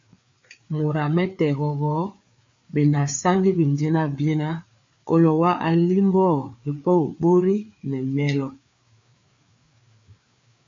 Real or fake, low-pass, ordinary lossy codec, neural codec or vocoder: fake; 7.2 kHz; MP3, 48 kbps; codec, 16 kHz, 4 kbps, FreqCodec, larger model